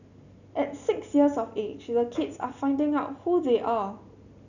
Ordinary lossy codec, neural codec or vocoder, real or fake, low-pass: none; none; real; 7.2 kHz